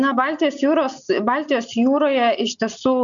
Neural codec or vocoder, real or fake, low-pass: none; real; 7.2 kHz